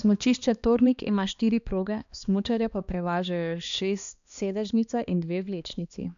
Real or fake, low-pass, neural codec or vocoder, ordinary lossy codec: fake; 7.2 kHz; codec, 16 kHz, 2 kbps, X-Codec, HuBERT features, trained on balanced general audio; none